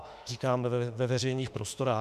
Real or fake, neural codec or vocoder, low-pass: fake; autoencoder, 48 kHz, 32 numbers a frame, DAC-VAE, trained on Japanese speech; 14.4 kHz